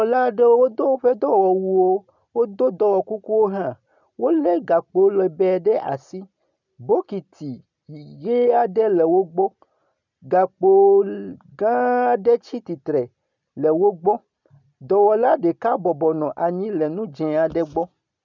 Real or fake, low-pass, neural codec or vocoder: real; 7.2 kHz; none